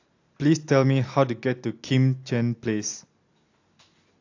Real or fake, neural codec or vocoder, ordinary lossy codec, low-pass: real; none; AAC, 48 kbps; 7.2 kHz